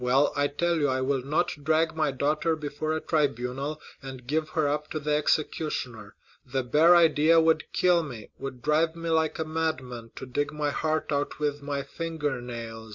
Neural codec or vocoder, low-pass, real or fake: none; 7.2 kHz; real